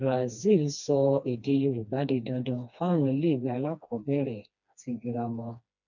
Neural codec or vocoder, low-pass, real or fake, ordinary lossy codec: codec, 16 kHz, 2 kbps, FreqCodec, smaller model; 7.2 kHz; fake; none